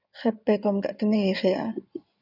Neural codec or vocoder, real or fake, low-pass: codec, 16 kHz in and 24 kHz out, 2.2 kbps, FireRedTTS-2 codec; fake; 5.4 kHz